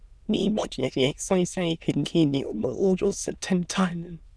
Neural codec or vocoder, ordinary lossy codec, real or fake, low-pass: autoencoder, 22.05 kHz, a latent of 192 numbers a frame, VITS, trained on many speakers; none; fake; none